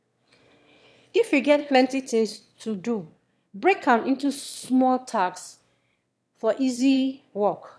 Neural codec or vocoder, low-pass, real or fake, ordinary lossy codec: autoencoder, 22.05 kHz, a latent of 192 numbers a frame, VITS, trained on one speaker; none; fake; none